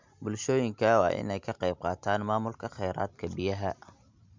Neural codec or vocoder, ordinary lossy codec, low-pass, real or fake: none; MP3, 64 kbps; 7.2 kHz; real